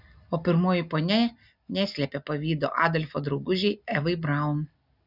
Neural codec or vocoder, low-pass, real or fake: none; 5.4 kHz; real